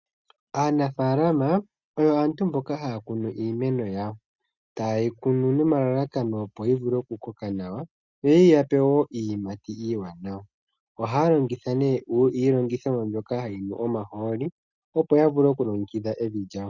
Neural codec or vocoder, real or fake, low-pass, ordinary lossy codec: none; real; 7.2 kHz; Opus, 64 kbps